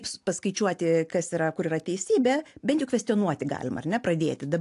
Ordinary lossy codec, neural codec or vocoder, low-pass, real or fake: AAC, 64 kbps; none; 10.8 kHz; real